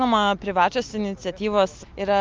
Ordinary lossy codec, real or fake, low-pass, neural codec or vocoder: Opus, 24 kbps; real; 7.2 kHz; none